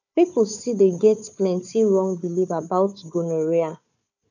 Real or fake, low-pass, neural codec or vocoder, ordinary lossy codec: fake; 7.2 kHz; codec, 16 kHz, 4 kbps, FunCodec, trained on Chinese and English, 50 frames a second; none